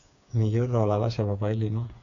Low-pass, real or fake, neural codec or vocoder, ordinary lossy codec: 7.2 kHz; fake; codec, 16 kHz, 4 kbps, FreqCodec, smaller model; MP3, 96 kbps